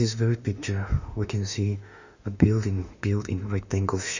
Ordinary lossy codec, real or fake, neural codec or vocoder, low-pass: Opus, 64 kbps; fake; autoencoder, 48 kHz, 32 numbers a frame, DAC-VAE, trained on Japanese speech; 7.2 kHz